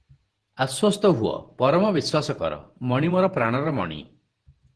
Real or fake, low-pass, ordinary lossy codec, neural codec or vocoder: fake; 10.8 kHz; Opus, 16 kbps; vocoder, 48 kHz, 128 mel bands, Vocos